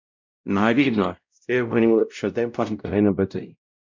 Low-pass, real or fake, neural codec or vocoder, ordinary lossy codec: 7.2 kHz; fake; codec, 16 kHz, 0.5 kbps, X-Codec, WavLM features, trained on Multilingual LibriSpeech; MP3, 64 kbps